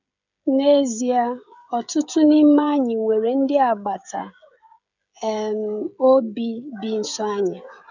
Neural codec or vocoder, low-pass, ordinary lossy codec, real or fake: codec, 16 kHz, 16 kbps, FreqCodec, smaller model; 7.2 kHz; none; fake